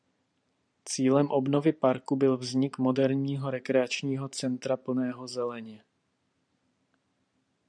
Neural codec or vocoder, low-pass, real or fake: vocoder, 44.1 kHz, 128 mel bands every 512 samples, BigVGAN v2; 9.9 kHz; fake